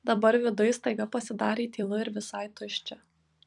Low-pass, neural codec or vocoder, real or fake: 10.8 kHz; vocoder, 44.1 kHz, 128 mel bands every 256 samples, BigVGAN v2; fake